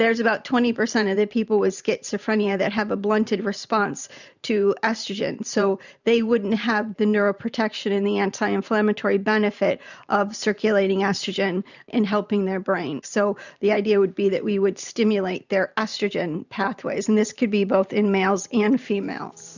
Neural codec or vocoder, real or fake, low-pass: vocoder, 44.1 kHz, 128 mel bands every 512 samples, BigVGAN v2; fake; 7.2 kHz